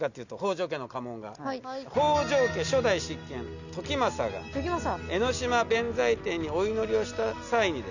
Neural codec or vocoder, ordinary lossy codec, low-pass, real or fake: none; none; 7.2 kHz; real